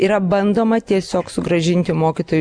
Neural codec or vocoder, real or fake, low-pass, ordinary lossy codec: none; real; 9.9 kHz; Opus, 64 kbps